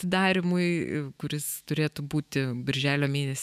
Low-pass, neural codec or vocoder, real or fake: 14.4 kHz; autoencoder, 48 kHz, 128 numbers a frame, DAC-VAE, trained on Japanese speech; fake